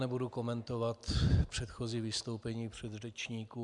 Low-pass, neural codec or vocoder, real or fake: 10.8 kHz; none; real